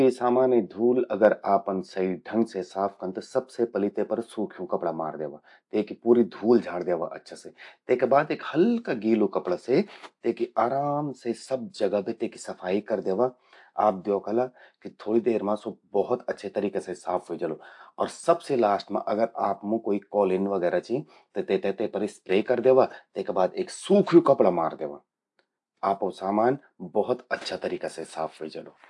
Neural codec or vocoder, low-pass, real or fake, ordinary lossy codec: none; 10.8 kHz; real; AAC, 64 kbps